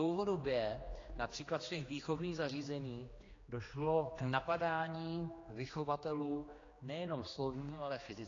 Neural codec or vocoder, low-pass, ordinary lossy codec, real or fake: codec, 16 kHz, 2 kbps, X-Codec, HuBERT features, trained on general audio; 7.2 kHz; AAC, 32 kbps; fake